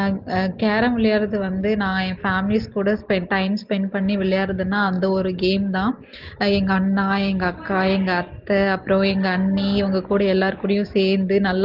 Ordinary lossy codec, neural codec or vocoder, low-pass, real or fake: Opus, 16 kbps; none; 5.4 kHz; real